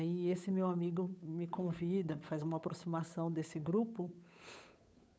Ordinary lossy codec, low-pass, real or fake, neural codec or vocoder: none; none; fake; codec, 16 kHz, 8 kbps, FunCodec, trained on Chinese and English, 25 frames a second